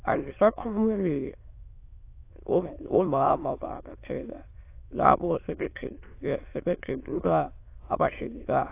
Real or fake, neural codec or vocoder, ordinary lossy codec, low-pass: fake; autoencoder, 22.05 kHz, a latent of 192 numbers a frame, VITS, trained on many speakers; AAC, 24 kbps; 3.6 kHz